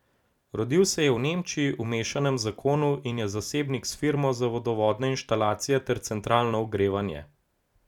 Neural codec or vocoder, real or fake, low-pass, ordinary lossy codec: none; real; 19.8 kHz; none